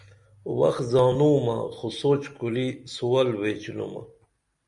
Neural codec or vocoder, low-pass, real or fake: none; 10.8 kHz; real